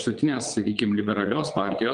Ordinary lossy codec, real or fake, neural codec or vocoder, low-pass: Opus, 24 kbps; fake; vocoder, 22.05 kHz, 80 mel bands, WaveNeXt; 9.9 kHz